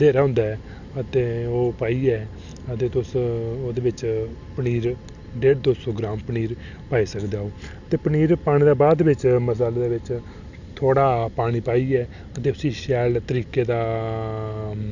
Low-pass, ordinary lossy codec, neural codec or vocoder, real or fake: 7.2 kHz; none; none; real